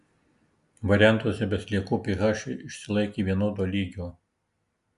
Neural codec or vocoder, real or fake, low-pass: none; real; 10.8 kHz